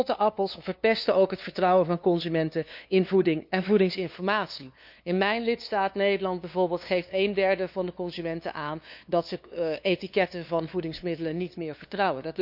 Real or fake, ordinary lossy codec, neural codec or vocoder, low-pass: fake; none; codec, 16 kHz, 2 kbps, FunCodec, trained on LibriTTS, 25 frames a second; 5.4 kHz